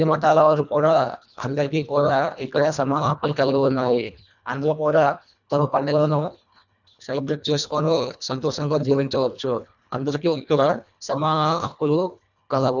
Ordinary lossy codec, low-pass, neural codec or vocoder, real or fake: none; 7.2 kHz; codec, 24 kHz, 1.5 kbps, HILCodec; fake